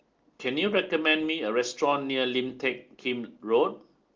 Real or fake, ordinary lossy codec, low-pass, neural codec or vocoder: real; Opus, 32 kbps; 7.2 kHz; none